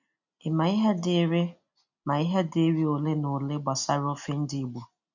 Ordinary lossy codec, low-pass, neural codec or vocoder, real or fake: none; 7.2 kHz; none; real